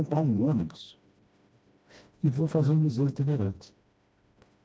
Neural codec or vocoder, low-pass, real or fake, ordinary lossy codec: codec, 16 kHz, 1 kbps, FreqCodec, smaller model; none; fake; none